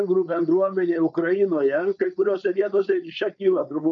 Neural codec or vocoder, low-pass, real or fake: codec, 16 kHz, 4.8 kbps, FACodec; 7.2 kHz; fake